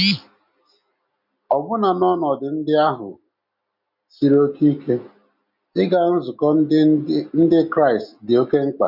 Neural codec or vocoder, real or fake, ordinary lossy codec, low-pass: none; real; none; 5.4 kHz